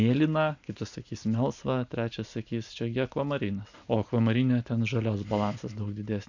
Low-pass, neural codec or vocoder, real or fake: 7.2 kHz; none; real